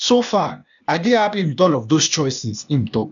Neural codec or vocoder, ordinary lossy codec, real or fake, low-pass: codec, 16 kHz, 0.8 kbps, ZipCodec; none; fake; 7.2 kHz